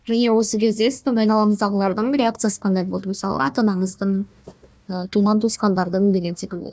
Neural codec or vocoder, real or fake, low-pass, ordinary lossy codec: codec, 16 kHz, 1 kbps, FunCodec, trained on Chinese and English, 50 frames a second; fake; none; none